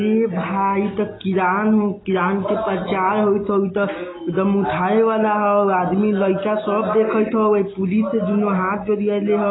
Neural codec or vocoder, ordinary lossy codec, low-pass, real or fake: none; AAC, 16 kbps; 7.2 kHz; real